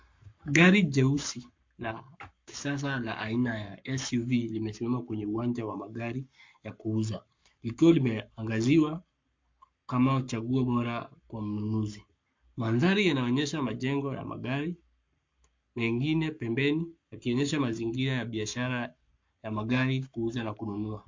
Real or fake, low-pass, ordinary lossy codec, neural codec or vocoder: fake; 7.2 kHz; MP3, 48 kbps; codec, 44.1 kHz, 7.8 kbps, Pupu-Codec